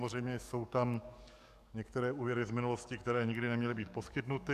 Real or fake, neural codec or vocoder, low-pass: fake; codec, 44.1 kHz, 7.8 kbps, DAC; 14.4 kHz